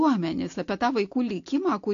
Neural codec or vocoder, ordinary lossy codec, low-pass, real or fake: none; AAC, 48 kbps; 7.2 kHz; real